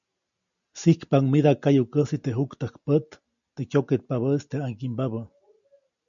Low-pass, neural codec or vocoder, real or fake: 7.2 kHz; none; real